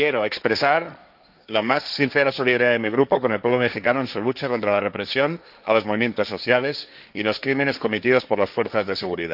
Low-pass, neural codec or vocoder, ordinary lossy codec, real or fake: 5.4 kHz; codec, 16 kHz, 1.1 kbps, Voila-Tokenizer; none; fake